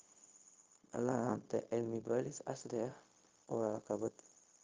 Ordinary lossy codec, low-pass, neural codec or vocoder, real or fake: Opus, 32 kbps; 7.2 kHz; codec, 16 kHz, 0.4 kbps, LongCat-Audio-Codec; fake